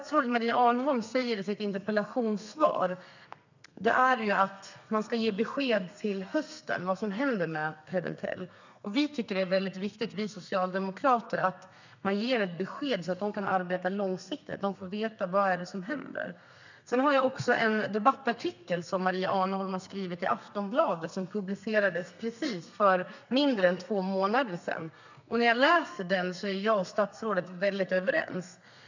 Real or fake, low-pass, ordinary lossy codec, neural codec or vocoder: fake; 7.2 kHz; none; codec, 32 kHz, 1.9 kbps, SNAC